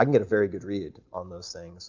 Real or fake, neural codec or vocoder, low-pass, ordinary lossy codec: real; none; 7.2 kHz; MP3, 48 kbps